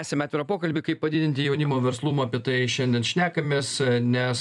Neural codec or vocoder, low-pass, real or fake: vocoder, 44.1 kHz, 128 mel bands every 256 samples, BigVGAN v2; 10.8 kHz; fake